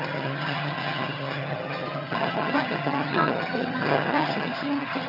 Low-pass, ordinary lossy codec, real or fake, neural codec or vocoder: 5.4 kHz; none; fake; vocoder, 22.05 kHz, 80 mel bands, HiFi-GAN